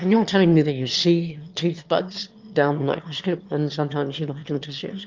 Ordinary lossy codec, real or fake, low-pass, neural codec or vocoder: Opus, 32 kbps; fake; 7.2 kHz; autoencoder, 22.05 kHz, a latent of 192 numbers a frame, VITS, trained on one speaker